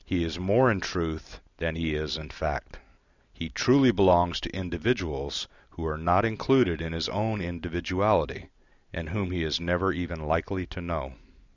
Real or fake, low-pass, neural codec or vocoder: real; 7.2 kHz; none